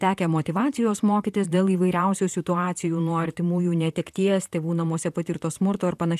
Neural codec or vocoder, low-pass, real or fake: vocoder, 44.1 kHz, 128 mel bands, Pupu-Vocoder; 14.4 kHz; fake